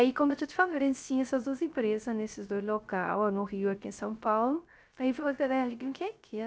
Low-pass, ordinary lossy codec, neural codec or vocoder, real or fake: none; none; codec, 16 kHz, 0.3 kbps, FocalCodec; fake